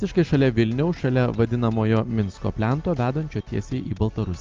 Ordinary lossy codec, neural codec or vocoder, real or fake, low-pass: Opus, 24 kbps; none; real; 7.2 kHz